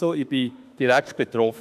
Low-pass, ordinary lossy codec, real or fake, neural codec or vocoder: 14.4 kHz; none; fake; autoencoder, 48 kHz, 32 numbers a frame, DAC-VAE, trained on Japanese speech